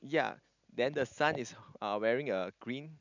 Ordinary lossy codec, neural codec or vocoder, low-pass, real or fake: none; none; 7.2 kHz; real